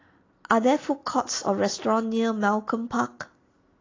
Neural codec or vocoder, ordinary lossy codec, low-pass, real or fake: none; AAC, 32 kbps; 7.2 kHz; real